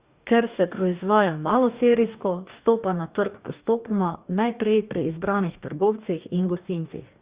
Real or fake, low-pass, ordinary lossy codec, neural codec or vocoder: fake; 3.6 kHz; Opus, 64 kbps; codec, 44.1 kHz, 2.6 kbps, DAC